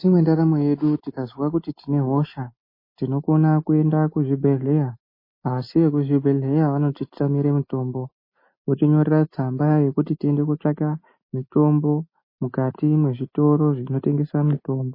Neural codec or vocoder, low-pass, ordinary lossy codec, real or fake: none; 5.4 kHz; MP3, 24 kbps; real